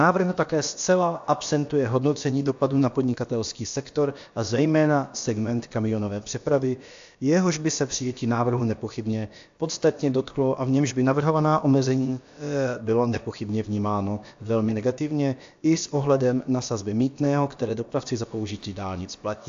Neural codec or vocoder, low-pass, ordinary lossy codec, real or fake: codec, 16 kHz, about 1 kbps, DyCAST, with the encoder's durations; 7.2 kHz; AAC, 64 kbps; fake